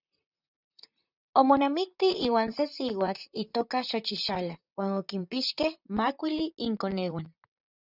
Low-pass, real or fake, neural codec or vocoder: 5.4 kHz; fake; vocoder, 44.1 kHz, 128 mel bands, Pupu-Vocoder